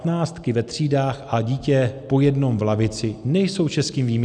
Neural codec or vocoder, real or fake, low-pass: none; real; 9.9 kHz